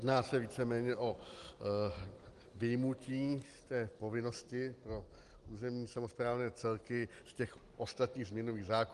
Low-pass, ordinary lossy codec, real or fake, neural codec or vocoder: 10.8 kHz; Opus, 24 kbps; real; none